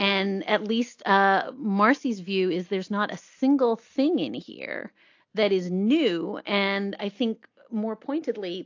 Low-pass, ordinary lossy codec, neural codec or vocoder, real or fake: 7.2 kHz; AAC, 48 kbps; none; real